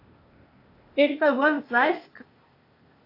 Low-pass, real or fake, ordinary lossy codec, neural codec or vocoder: 5.4 kHz; fake; AAC, 32 kbps; codec, 16 kHz, 0.8 kbps, ZipCodec